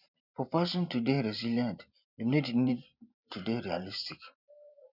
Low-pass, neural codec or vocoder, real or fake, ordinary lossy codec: 5.4 kHz; vocoder, 44.1 kHz, 128 mel bands every 512 samples, BigVGAN v2; fake; none